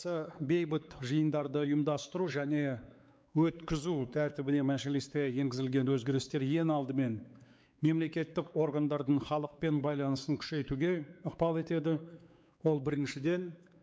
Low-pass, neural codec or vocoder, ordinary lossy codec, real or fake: none; codec, 16 kHz, 4 kbps, X-Codec, WavLM features, trained on Multilingual LibriSpeech; none; fake